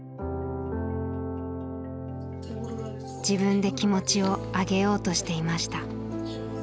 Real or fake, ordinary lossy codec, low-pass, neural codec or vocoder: real; none; none; none